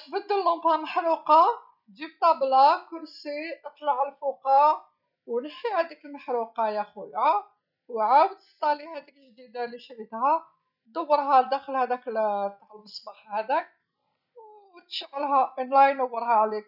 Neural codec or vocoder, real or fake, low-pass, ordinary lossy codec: none; real; 5.4 kHz; none